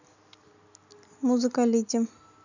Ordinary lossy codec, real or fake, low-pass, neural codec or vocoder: none; real; 7.2 kHz; none